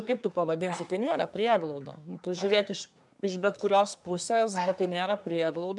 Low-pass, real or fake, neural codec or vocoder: 10.8 kHz; fake; codec, 24 kHz, 1 kbps, SNAC